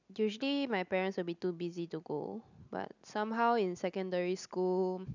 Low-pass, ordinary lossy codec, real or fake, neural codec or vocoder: 7.2 kHz; none; real; none